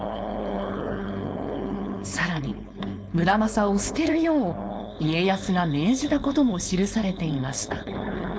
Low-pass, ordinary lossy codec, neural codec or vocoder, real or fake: none; none; codec, 16 kHz, 4.8 kbps, FACodec; fake